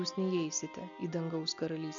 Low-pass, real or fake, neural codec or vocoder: 7.2 kHz; real; none